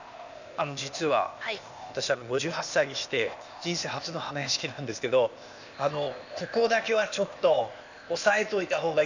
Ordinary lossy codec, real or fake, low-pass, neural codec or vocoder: none; fake; 7.2 kHz; codec, 16 kHz, 0.8 kbps, ZipCodec